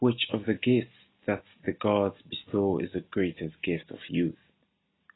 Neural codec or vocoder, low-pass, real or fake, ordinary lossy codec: none; 7.2 kHz; real; AAC, 16 kbps